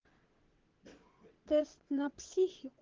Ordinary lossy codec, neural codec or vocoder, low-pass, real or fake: Opus, 16 kbps; vocoder, 22.05 kHz, 80 mel bands, WaveNeXt; 7.2 kHz; fake